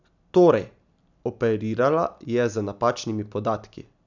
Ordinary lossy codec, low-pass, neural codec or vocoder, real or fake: none; 7.2 kHz; none; real